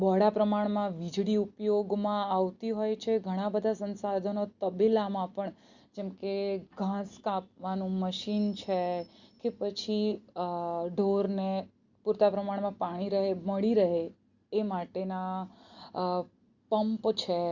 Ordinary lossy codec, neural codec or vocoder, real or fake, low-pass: Opus, 64 kbps; none; real; 7.2 kHz